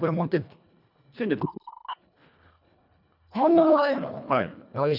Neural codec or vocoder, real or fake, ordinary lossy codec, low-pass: codec, 24 kHz, 1.5 kbps, HILCodec; fake; none; 5.4 kHz